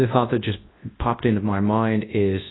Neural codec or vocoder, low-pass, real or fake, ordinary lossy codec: codec, 24 kHz, 0.9 kbps, WavTokenizer, large speech release; 7.2 kHz; fake; AAC, 16 kbps